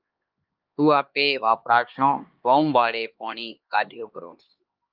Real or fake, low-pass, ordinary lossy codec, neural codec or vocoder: fake; 5.4 kHz; Opus, 24 kbps; codec, 16 kHz, 2 kbps, X-Codec, HuBERT features, trained on LibriSpeech